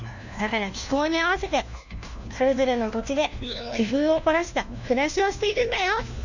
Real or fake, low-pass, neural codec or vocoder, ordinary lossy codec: fake; 7.2 kHz; codec, 16 kHz, 1 kbps, FunCodec, trained on LibriTTS, 50 frames a second; none